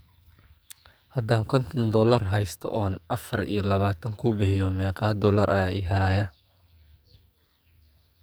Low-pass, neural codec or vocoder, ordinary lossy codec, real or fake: none; codec, 44.1 kHz, 2.6 kbps, SNAC; none; fake